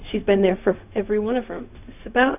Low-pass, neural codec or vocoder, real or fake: 3.6 kHz; codec, 16 kHz, 0.4 kbps, LongCat-Audio-Codec; fake